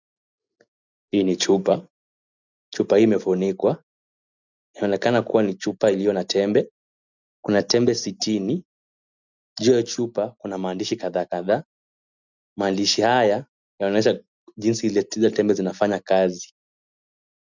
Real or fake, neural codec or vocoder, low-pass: real; none; 7.2 kHz